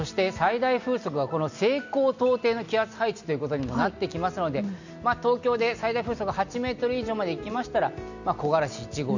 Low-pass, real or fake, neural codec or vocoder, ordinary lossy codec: 7.2 kHz; real; none; none